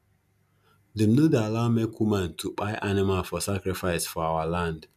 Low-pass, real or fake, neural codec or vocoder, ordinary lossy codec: 14.4 kHz; real; none; none